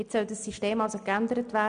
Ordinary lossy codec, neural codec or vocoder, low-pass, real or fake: none; none; 9.9 kHz; real